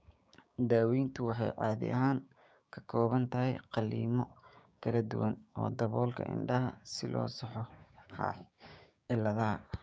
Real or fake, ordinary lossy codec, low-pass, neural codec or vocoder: fake; none; none; codec, 16 kHz, 6 kbps, DAC